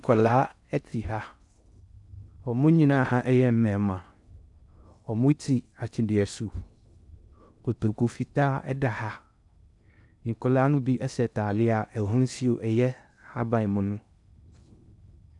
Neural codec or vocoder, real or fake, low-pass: codec, 16 kHz in and 24 kHz out, 0.6 kbps, FocalCodec, streaming, 4096 codes; fake; 10.8 kHz